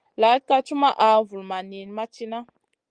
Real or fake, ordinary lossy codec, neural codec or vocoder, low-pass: real; Opus, 24 kbps; none; 9.9 kHz